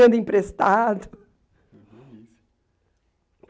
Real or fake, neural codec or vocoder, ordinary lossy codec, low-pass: real; none; none; none